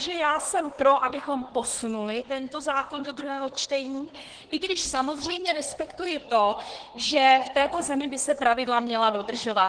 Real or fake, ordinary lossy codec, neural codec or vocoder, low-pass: fake; Opus, 16 kbps; codec, 24 kHz, 1 kbps, SNAC; 9.9 kHz